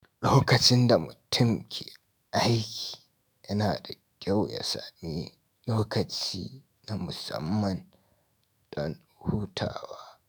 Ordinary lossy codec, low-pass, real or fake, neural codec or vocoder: none; none; fake; autoencoder, 48 kHz, 128 numbers a frame, DAC-VAE, trained on Japanese speech